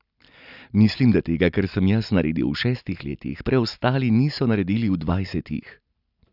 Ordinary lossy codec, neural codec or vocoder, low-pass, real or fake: none; none; 5.4 kHz; real